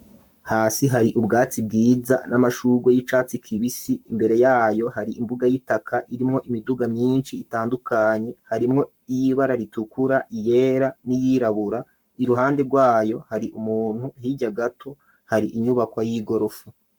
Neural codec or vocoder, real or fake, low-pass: codec, 44.1 kHz, 7.8 kbps, Pupu-Codec; fake; 19.8 kHz